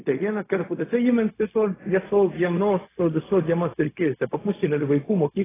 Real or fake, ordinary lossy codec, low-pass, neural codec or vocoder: fake; AAC, 16 kbps; 3.6 kHz; codec, 16 kHz, 0.4 kbps, LongCat-Audio-Codec